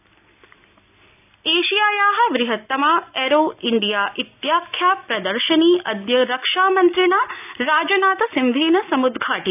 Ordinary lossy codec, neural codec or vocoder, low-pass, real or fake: none; none; 3.6 kHz; real